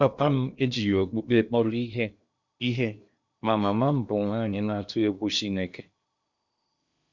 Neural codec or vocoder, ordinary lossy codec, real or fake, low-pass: codec, 16 kHz in and 24 kHz out, 0.8 kbps, FocalCodec, streaming, 65536 codes; Opus, 64 kbps; fake; 7.2 kHz